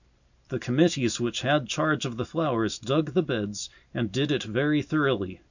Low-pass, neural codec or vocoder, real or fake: 7.2 kHz; none; real